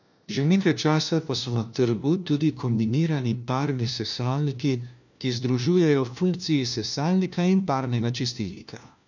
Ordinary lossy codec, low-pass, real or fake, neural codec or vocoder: none; 7.2 kHz; fake; codec, 16 kHz, 1 kbps, FunCodec, trained on LibriTTS, 50 frames a second